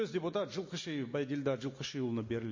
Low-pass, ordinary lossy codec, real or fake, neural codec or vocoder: 7.2 kHz; MP3, 32 kbps; real; none